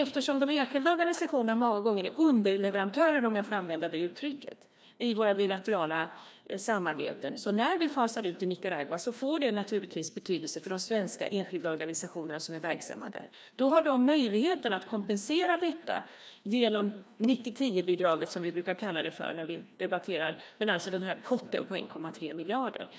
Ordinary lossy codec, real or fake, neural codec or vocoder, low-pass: none; fake; codec, 16 kHz, 1 kbps, FreqCodec, larger model; none